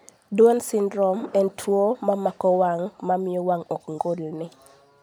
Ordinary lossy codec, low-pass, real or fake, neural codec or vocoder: none; 19.8 kHz; real; none